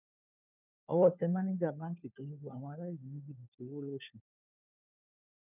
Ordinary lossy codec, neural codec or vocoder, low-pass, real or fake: none; codec, 16 kHz, 4 kbps, FunCodec, trained on LibriTTS, 50 frames a second; 3.6 kHz; fake